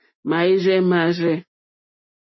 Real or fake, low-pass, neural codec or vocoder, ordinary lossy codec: real; 7.2 kHz; none; MP3, 24 kbps